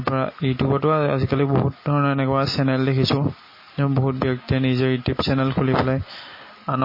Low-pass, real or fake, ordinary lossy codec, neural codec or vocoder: 5.4 kHz; real; MP3, 24 kbps; none